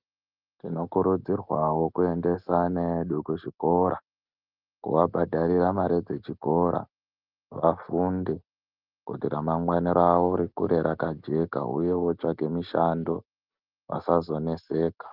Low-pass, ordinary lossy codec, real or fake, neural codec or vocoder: 5.4 kHz; Opus, 24 kbps; real; none